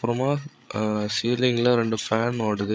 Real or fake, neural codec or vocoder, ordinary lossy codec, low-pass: fake; codec, 16 kHz, 8 kbps, FreqCodec, larger model; none; none